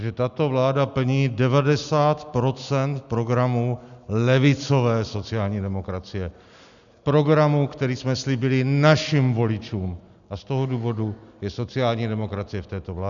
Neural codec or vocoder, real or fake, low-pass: none; real; 7.2 kHz